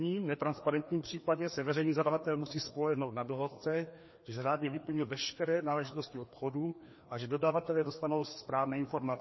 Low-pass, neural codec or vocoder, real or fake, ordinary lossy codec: 7.2 kHz; codec, 16 kHz, 2 kbps, FreqCodec, larger model; fake; MP3, 24 kbps